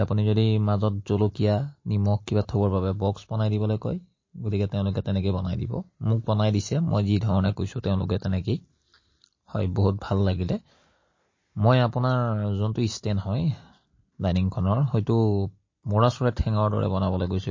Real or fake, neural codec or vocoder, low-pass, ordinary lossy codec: real; none; 7.2 kHz; MP3, 32 kbps